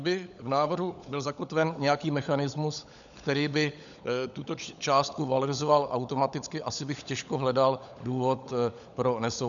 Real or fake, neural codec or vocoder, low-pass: fake; codec, 16 kHz, 16 kbps, FunCodec, trained on LibriTTS, 50 frames a second; 7.2 kHz